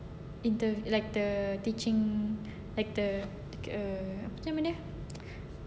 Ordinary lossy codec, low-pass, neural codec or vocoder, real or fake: none; none; none; real